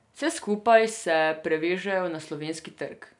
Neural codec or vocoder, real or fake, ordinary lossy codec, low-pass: none; real; none; 10.8 kHz